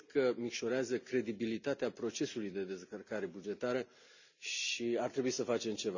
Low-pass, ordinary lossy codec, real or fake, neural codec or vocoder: 7.2 kHz; none; real; none